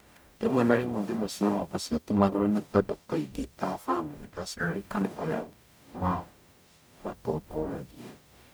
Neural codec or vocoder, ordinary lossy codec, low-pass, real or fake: codec, 44.1 kHz, 0.9 kbps, DAC; none; none; fake